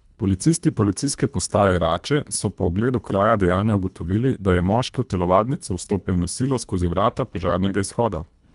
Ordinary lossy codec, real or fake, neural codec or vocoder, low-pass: none; fake; codec, 24 kHz, 1.5 kbps, HILCodec; 10.8 kHz